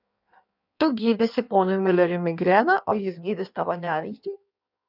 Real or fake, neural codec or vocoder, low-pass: fake; codec, 16 kHz in and 24 kHz out, 1.1 kbps, FireRedTTS-2 codec; 5.4 kHz